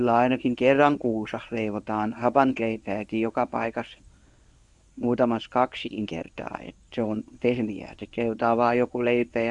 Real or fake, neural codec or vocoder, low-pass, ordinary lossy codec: fake; codec, 24 kHz, 0.9 kbps, WavTokenizer, medium speech release version 1; 10.8 kHz; none